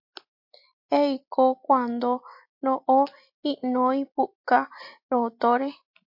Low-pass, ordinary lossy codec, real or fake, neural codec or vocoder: 5.4 kHz; MP3, 32 kbps; real; none